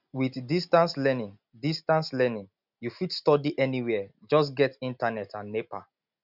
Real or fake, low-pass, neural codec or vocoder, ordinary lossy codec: real; 5.4 kHz; none; none